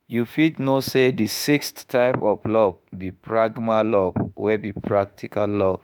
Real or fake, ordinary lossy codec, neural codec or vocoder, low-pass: fake; none; autoencoder, 48 kHz, 32 numbers a frame, DAC-VAE, trained on Japanese speech; none